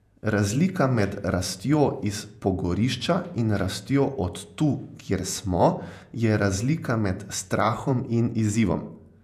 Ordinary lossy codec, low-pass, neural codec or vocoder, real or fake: none; 14.4 kHz; vocoder, 48 kHz, 128 mel bands, Vocos; fake